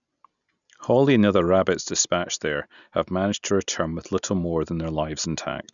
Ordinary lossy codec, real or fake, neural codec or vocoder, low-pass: none; real; none; 7.2 kHz